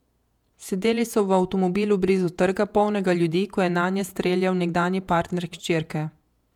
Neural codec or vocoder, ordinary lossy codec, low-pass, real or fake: vocoder, 48 kHz, 128 mel bands, Vocos; MP3, 96 kbps; 19.8 kHz; fake